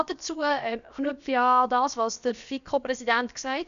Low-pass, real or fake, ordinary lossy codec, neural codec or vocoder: 7.2 kHz; fake; none; codec, 16 kHz, about 1 kbps, DyCAST, with the encoder's durations